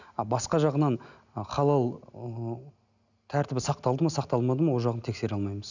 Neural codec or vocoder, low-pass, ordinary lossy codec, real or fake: none; 7.2 kHz; none; real